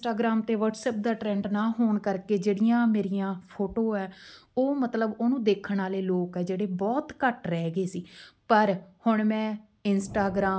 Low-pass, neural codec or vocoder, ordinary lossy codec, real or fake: none; none; none; real